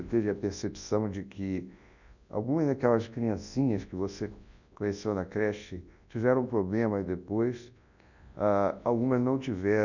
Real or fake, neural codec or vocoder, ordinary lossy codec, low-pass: fake; codec, 24 kHz, 0.9 kbps, WavTokenizer, large speech release; none; 7.2 kHz